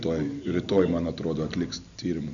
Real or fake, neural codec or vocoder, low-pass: real; none; 7.2 kHz